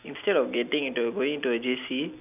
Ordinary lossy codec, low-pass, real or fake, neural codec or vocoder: none; 3.6 kHz; real; none